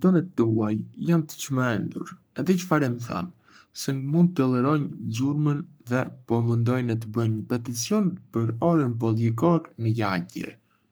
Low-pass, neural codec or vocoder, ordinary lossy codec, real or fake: none; codec, 44.1 kHz, 3.4 kbps, Pupu-Codec; none; fake